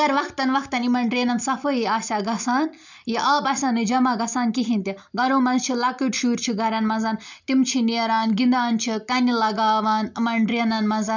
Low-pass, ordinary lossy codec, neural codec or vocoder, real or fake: 7.2 kHz; none; none; real